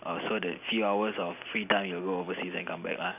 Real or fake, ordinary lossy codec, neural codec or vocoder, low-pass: real; none; none; 3.6 kHz